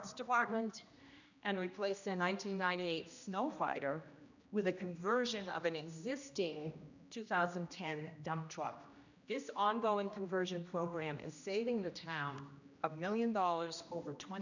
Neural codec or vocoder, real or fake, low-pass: codec, 16 kHz, 1 kbps, X-Codec, HuBERT features, trained on general audio; fake; 7.2 kHz